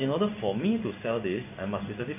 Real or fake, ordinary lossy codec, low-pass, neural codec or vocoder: fake; AAC, 24 kbps; 3.6 kHz; codec, 16 kHz in and 24 kHz out, 1 kbps, XY-Tokenizer